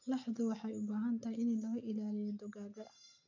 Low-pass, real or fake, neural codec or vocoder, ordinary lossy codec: 7.2 kHz; real; none; none